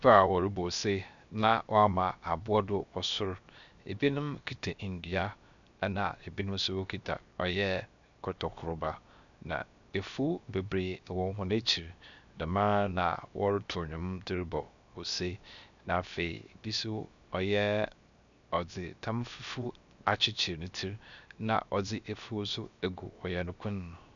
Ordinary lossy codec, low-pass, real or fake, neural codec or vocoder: MP3, 96 kbps; 7.2 kHz; fake; codec, 16 kHz, 0.7 kbps, FocalCodec